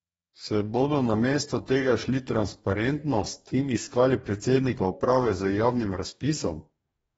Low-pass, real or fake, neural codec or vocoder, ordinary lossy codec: 19.8 kHz; fake; codec, 44.1 kHz, 2.6 kbps, DAC; AAC, 24 kbps